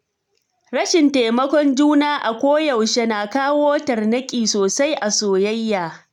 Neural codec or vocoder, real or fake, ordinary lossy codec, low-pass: none; real; none; 19.8 kHz